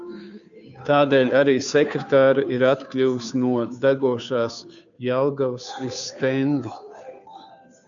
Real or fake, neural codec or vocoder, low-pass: fake; codec, 16 kHz, 2 kbps, FunCodec, trained on Chinese and English, 25 frames a second; 7.2 kHz